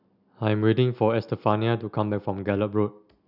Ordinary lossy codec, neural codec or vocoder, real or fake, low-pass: none; none; real; 5.4 kHz